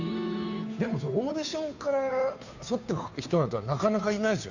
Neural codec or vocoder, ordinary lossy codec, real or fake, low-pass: codec, 16 kHz, 2 kbps, FunCodec, trained on Chinese and English, 25 frames a second; none; fake; 7.2 kHz